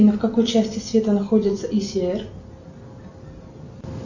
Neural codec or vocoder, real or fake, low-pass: none; real; 7.2 kHz